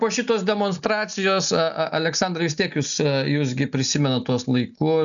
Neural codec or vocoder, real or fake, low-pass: none; real; 7.2 kHz